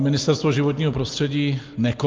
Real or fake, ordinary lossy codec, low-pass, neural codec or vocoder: real; Opus, 32 kbps; 7.2 kHz; none